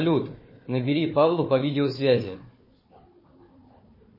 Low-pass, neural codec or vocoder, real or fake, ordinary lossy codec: 5.4 kHz; codec, 16 kHz, 4 kbps, FunCodec, trained on Chinese and English, 50 frames a second; fake; MP3, 24 kbps